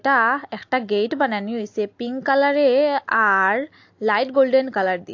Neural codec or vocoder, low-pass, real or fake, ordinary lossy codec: none; 7.2 kHz; real; AAC, 48 kbps